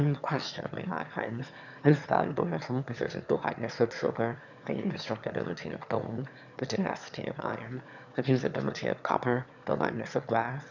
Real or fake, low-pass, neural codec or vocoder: fake; 7.2 kHz; autoencoder, 22.05 kHz, a latent of 192 numbers a frame, VITS, trained on one speaker